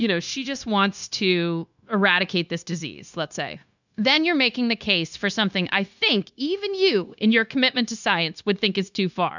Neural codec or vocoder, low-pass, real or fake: codec, 16 kHz, 0.9 kbps, LongCat-Audio-Codec; 7.2 kHz; fake